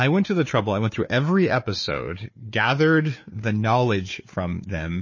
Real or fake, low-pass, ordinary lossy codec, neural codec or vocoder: fake; 7.2 kHz; MP3, 32 kbps; codec, 16 kHz, 4 kbps, FunCodec, trained on Chinese and English, 50 frames a second